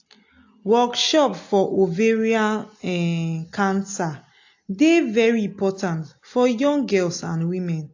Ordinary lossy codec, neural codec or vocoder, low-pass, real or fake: AAC, 48 kbps; none; 7.2 kHz; real